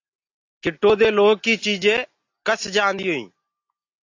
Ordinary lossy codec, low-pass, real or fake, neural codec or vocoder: AAC, 48 kbps; 7.2 kHz; real; none